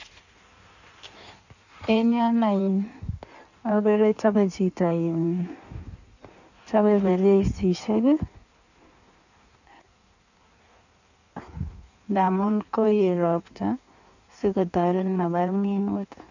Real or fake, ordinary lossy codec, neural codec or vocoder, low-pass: fake; none; codec, 16 kHz in and 24 kHz out, 1.1 kbps, FireRedTTS-2 codec; 7.2 kHz